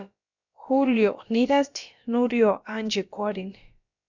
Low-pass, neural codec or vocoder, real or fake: 7.2 kHz; codec, 16 kHz, about 1 kbps, DyCAST, with the encoder's durations; fake